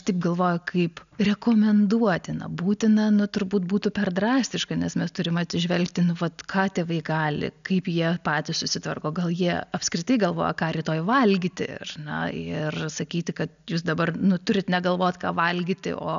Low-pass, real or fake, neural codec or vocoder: 7.2 kHz; real; none